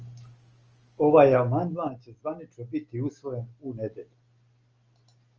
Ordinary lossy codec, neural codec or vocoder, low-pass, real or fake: Opus, 24 kbps; none; 7.2 kHz; real